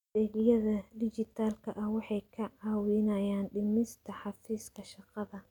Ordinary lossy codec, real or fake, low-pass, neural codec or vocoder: none; real; 19.8 kHz; none